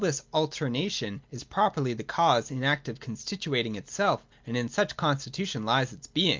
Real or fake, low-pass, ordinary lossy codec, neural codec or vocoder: real; 7.2 kHz; Opus, 24 kbps; none